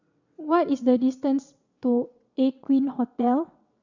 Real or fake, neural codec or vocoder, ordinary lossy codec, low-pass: fake; vocoder, 22.05 kHz, 80 mel bands, WaveNeXt; none; 7.2 kHz